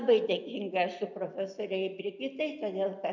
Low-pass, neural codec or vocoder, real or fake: 7.2 kHz; none; real